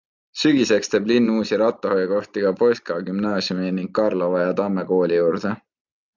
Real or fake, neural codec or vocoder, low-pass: real; none; 7.2 kHz